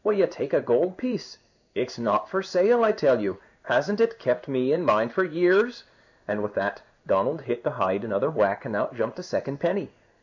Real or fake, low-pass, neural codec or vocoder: fake; 7.2 kHz; codec, 16 kHz in and 24 kHz out, 1 kbps, XY-Tokenizer